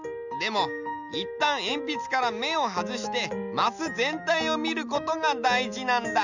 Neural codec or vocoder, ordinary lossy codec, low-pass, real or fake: none; none; 7.2 kHz; real